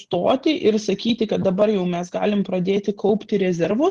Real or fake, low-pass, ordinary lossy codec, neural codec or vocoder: real; 10.8 kHz; Opus, 16 kbps; none